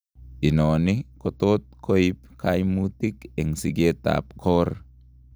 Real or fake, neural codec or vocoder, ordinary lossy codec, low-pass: real; none; none; none